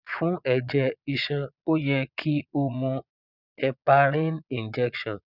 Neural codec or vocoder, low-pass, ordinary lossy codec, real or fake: vocoder, 22.05 kHz, 80 mel bands, Vocos; 5.4 kHz; none; fake